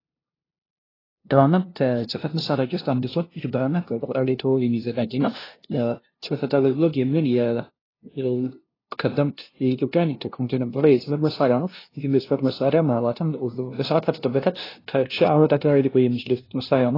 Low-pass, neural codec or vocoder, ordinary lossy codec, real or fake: 5.4 kHz; codec, 16 kHz, 0.5 kbps, FunCodec, trained on LibriTTS, 25 frames a second; AAC, 24 kbps; fake